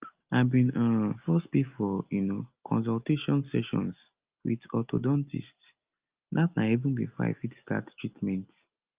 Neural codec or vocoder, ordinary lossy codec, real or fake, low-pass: none; Opus, 24 kbps; real; 3.6 kHz